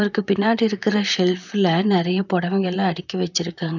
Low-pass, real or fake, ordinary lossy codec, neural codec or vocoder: 7.2 kHz; fake; none; vocoder, 44.1 kHz, 128 mel bands, Pupu-Vocoder